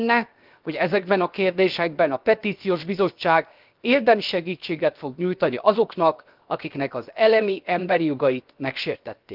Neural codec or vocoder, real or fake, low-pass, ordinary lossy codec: codec, 16 kHz, 0.7 kbps, FocalCodec; fake; 5.4 kHz; Opus, 24 kbps